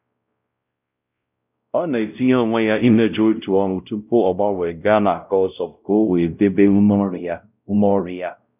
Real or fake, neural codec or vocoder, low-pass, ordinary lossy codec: fake; codec, 16 kHz, 0.5 kbps, X-Codec, WavLM features, trained on Multilingual LibriSpeech; 3.6 kHz; none